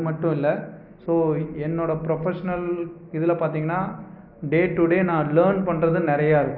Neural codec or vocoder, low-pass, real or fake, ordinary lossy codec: none; 5.4 kHz; real; none